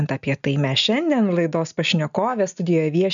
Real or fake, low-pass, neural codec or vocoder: real; 7.2 kHz; none